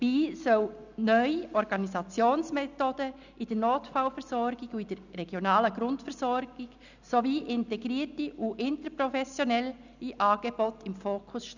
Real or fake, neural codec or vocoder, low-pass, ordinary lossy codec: real; none; 7.2 kHz; none